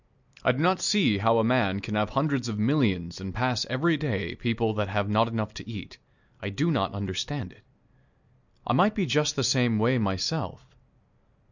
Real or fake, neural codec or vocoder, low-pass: real; none; 7.2 kHz